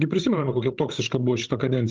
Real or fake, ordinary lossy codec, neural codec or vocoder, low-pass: fake; Opus, 24 kbps; codec, 16 kHz, 16 kbps, FreqCodec, larger model; 7.2 kHz